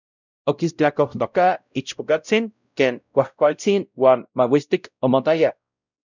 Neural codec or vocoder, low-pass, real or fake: codec, 16 kHz, 0.5 kbps, X-Codec, WavLM features, trained on Multilingual LibriSpeech; 7.2 kHz; fake